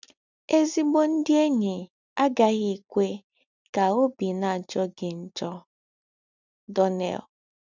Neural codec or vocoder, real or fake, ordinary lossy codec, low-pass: none; real; none; 7.2 kHz